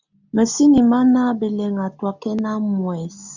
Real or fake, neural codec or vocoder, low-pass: real; none; 7.2 kHz